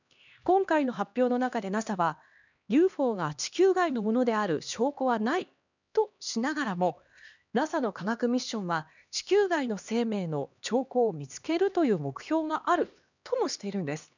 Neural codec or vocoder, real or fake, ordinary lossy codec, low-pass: codec, 16 kHz, 2 kbps, X-Codec, HuBERT features, trained on LibriSpeech; fake; none; 7.2 kHz